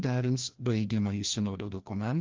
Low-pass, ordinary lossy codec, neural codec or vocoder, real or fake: 7.2 kHz; Opus, 32 kbps; codec, 16 kHz, 1 kbps, FreqCodec, larger model; fake